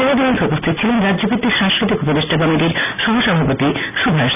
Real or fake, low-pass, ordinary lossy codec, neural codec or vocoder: real; 3.6 kHz; none; none